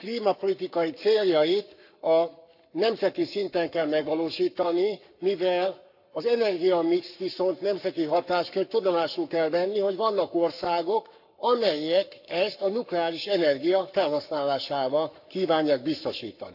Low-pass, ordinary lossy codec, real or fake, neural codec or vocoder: 5.4 kHz; none; fake; codec, 44.1 kHz, 7.8 kbps, Pupu-Codec